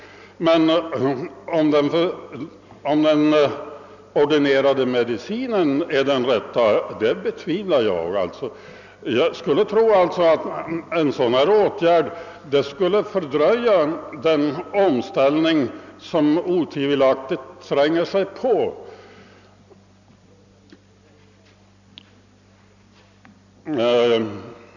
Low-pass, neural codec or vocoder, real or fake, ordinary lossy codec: 7.2 kHz; none; real; none